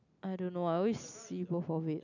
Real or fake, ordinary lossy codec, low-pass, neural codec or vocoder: real; none; 7.2 kHz; none